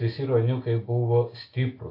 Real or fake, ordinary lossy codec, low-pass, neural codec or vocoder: real; AAC, 24 kbps; 5.4 kHz; none